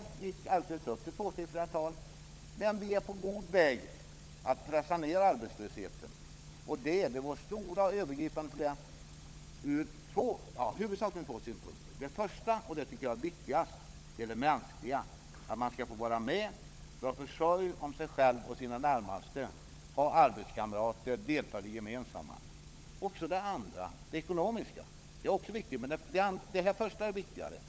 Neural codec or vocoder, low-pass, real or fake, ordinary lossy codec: codec, 16 kHz, 16 kbps, FunCodec, trained on LibriTTS, 50 frames a second; none; fake; none